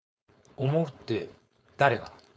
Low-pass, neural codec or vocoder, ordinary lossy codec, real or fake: none; codec, 16 kHz, 4.8 kbps, FACodec; none; fake